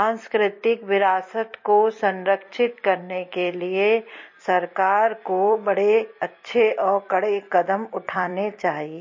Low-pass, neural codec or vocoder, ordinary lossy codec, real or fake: 7.2 kHz; vocoder, 44.1 kHz, 128 mel bands every 256 samples, BigVGAN v2; MP3, 32 kbps; fake